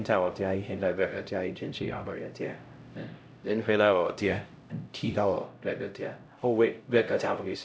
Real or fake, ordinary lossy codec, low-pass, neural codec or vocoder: fake; none; none; codec, 16 kHz, 0.5 kbps, X-Codec, HuBERT features, trained on LibriSpeech